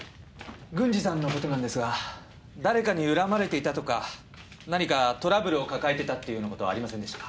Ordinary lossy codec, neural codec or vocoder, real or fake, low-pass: none; none; real; none